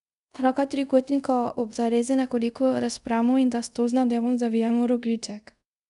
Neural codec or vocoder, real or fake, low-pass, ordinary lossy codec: codec, 24 kHz, 0.5 kbps, DualCodec; fake; 10.8 kHz; none